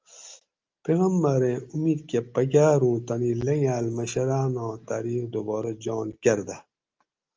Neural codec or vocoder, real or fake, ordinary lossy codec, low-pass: none; real; Opus, 24 kbps; 7.2 kHz